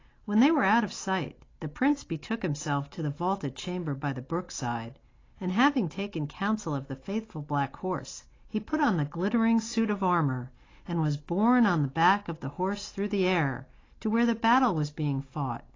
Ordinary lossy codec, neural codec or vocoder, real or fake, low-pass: AAC, 32 kbps; none; real; 7.2 kHz